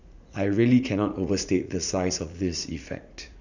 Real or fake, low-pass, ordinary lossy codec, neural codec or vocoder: fake; 7.2 kHz; none; vocoder, 44.1 kHz, 80 mel bands, Vocos